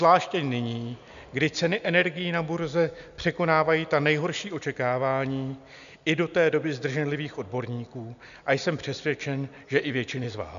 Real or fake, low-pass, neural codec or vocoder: real; 7.2 kHz; none